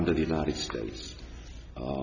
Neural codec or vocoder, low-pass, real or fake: none; 7.2 kHz; real